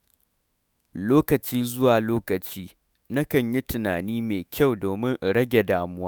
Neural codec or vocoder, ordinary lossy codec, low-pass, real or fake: autoencoder, 48 kHz, 128 numbers a frame, DAC-VAE, trained on Japanese speech; none; none; fake